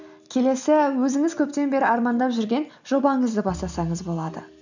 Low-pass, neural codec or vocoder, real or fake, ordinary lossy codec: 7.2 kHz; none; real; none